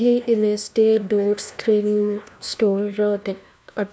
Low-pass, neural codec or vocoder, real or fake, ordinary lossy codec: none; codec, 16 kHz, 1 kbps, FunCodec, trained on LibriTTS, 50 frames a second; fake; none